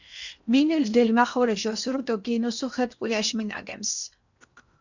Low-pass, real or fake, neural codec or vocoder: 7.2 kHz; fake; codec, 16 kHz in and 24 kHz out, 0.8 kbps, FocalCodec, streaming, 65536 codes